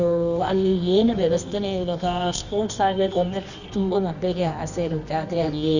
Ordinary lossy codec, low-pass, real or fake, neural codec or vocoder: none; 7.2 kHz; fake; codec, 24 kHz, 0.9 kbps, WavTokenizer, medium music audio release